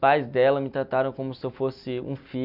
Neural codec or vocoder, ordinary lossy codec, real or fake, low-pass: none; none; real; 5.4 kHz